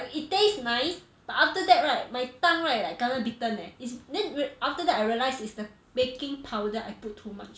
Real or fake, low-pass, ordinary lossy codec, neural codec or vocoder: real; none; none; none